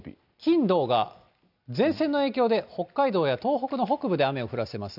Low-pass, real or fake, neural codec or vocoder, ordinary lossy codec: 5.4 kHz; real; none; none